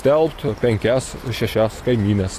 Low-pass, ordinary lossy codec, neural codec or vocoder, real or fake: 14.4 kHz; AAC, 48 kbps; none; real